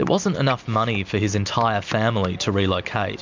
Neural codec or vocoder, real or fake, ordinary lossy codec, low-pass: none; real; MP3, 64 kbps; 7.2 kHz